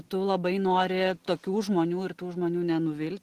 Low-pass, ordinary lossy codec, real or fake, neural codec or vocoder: 14.4 kHz; Opus, 16 kbps; real; none